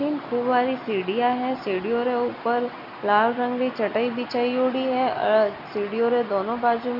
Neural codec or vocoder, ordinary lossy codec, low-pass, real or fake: none; none; 5.4 kHz; real